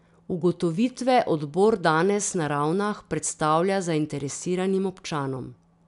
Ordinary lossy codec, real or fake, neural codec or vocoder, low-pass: none; real; none; 10.8 kHz